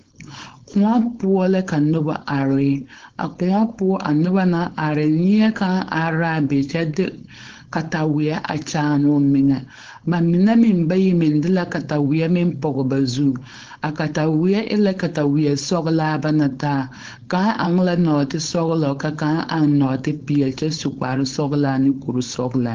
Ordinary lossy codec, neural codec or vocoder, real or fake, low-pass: Opus, 16 kbps; codec, 16 kHz, 4.8 kbps, FACodec; fake; 7.2 kHz